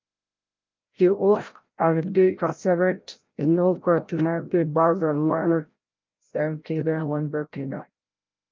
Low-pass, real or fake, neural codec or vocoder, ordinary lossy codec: 7.2 kHz; fake; codec, 16 kHz, 0.5 kbps, FreqCodec, larger model; Opus, 32 kbps